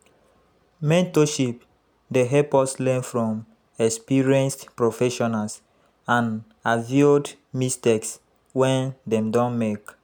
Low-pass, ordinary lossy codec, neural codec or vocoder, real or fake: none; none; none; real